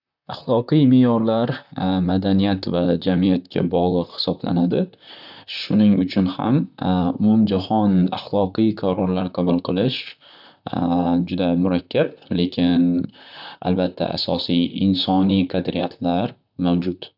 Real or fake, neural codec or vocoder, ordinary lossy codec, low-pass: fake; vocoder, 44.1 kHz, 128 mel bands, Pupu-Vocoder; none; 5.4 kHz